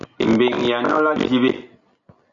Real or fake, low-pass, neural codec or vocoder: real; 7.2 kHz; none